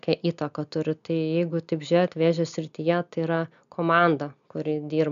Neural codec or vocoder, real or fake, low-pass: none; real; 7.2 kHz